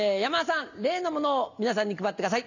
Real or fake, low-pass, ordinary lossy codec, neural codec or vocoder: real; 7.2 kHz; none; none